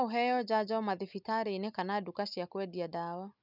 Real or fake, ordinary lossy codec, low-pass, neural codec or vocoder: real; none; 5.4 kHz; none